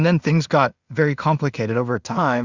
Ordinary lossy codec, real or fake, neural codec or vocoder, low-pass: Opus, 64 kbps; fake; codec, 16 kHz in and 24 kHz out, 0.4 kbps, LongCat-Audio-Codec, two codebook decoder; 7.2 kHz